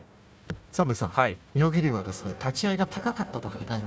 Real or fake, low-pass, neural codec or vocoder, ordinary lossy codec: fake; none; codec, 16 kHz, 1 kbps, FunCodec, trained on Chinese and English, 50 frames a second; none